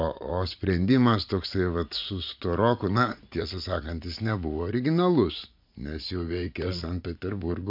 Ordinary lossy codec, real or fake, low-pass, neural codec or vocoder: MP3, 48 kbps; fake; 5.4 kHz; vocoder, 44.1 kHz, 80 mel bands, Vocos